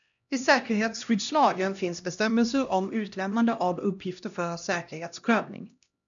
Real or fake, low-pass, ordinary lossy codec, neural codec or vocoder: fake; 7.2 kHz; MP3, 96 kbps; codec, 16 kHz, 1 kbps, X-Codec, HuBERT features, trained on LibriSpeech